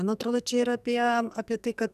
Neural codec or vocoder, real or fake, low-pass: codec, 44.1 kHz, 2.6 kbps, SNAC; fake; 14.4 kHz